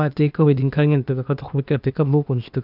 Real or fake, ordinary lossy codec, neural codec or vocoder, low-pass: fake; none; codec, 16 kHz, 0.8 kbps, ZipCodec; 5.4 kHz